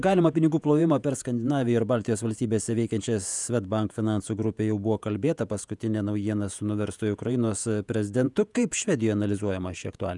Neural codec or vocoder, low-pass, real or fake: vocoder, 48 kHz, 128 mel bands, Vocos; 10.8 kHz; fake